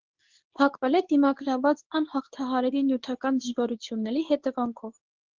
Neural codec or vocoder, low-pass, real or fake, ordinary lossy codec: codec, 24 kHz, 0.9 kbps, WavTokenizer, medium speech release version 2; 7.2 kHz; fake; Opus, 16 kbps